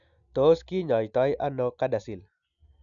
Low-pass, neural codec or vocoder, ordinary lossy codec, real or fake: 7.2 kHz; none; none; real